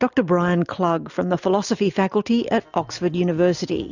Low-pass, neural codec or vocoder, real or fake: 7.2 kHz; none; real